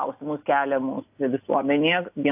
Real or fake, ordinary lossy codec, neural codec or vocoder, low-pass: real; AAC, 32 kbps; none; 3.6 kHz